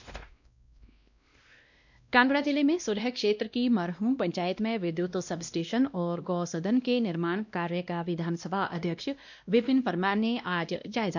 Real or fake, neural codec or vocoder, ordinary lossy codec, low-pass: fake; codec, 16 kHz, 1 kbps, X-Codec, WavLM features, trained on Multilingual LibriSpeech; none; 7.2 kHz